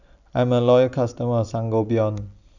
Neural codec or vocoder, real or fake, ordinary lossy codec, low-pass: none; real; none; 7.2 kHz